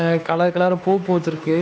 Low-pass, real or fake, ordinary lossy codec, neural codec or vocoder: none; fake; none; codec, 16 kHz, 2 kbps, X-Codec, HuBERT features, trained on LibriSpeech